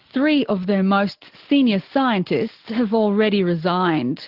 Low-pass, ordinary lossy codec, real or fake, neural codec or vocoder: 5.4 kHz; Opus, 16 kbps; real; none